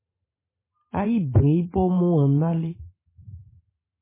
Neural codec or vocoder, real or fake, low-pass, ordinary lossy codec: vocoder, 44.1 kHz, 128 mel bands every 512 samples, BigVGAN v2; fake; 3.6 kHz; MP3, 16 kbps